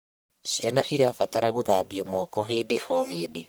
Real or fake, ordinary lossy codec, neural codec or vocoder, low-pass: fake; none; codec, 44.1 kHz, 1.7 kbps, Pupu-Codec; none